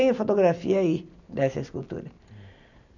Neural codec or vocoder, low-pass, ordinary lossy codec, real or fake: none; 7.2 kHz; none; real